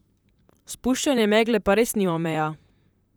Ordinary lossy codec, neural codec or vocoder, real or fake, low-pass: none; vocoder, 44.1 kHz, 128 mel bands, Pupu-Vocoder; fake; none